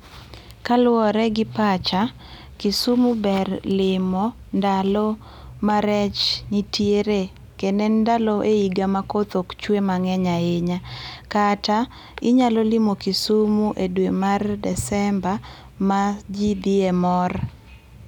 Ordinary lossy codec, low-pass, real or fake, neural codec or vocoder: none; 19.8 kHz; real; none